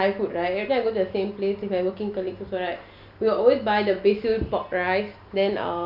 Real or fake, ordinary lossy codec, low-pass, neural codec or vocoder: real; none; 5.4 kHz; none